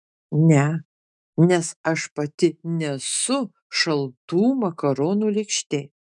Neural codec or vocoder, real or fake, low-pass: autoencoder, 48 kHz, 128 numbers a frame, DAC-VAE, trained on Japanese speech; fake; 10.8 kHz